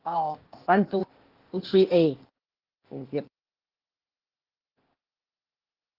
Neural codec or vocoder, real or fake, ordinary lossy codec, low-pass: codec, 16 kHz, 0.8 kbps, ZipCodec; fake; Opus, 16 kbps; 5.4 kHz